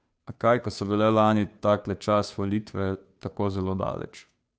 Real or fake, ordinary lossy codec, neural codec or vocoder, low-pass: fake; none; codec, 16 kHz, 2 kbps, FunCodec, trained on Chinese and English, 25 frames a second; none